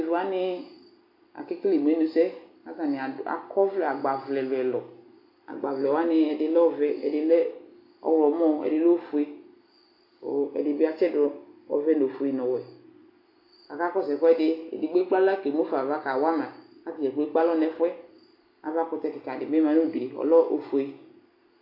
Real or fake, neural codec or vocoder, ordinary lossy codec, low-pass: real; none; AAC, 32 kbps; 5.4 kHz